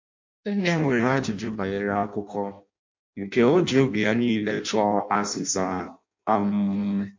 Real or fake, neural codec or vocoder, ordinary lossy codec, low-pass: fake; codec, 16 kHz in and 24 kHz out, 0.6 kbps, FireRedTTS-2 codec; MP3, 48 kbps; 7.2 kHz